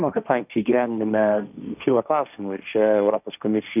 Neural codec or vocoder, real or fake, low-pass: codec, 16 kHz, 1.1 kbps, Voila-Tokenizer; fake; 3.6 kHz